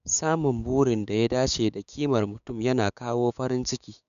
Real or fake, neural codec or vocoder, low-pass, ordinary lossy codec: fake; codec, 16 kHz, 6 kbps, DAC; 7.2 kHz; none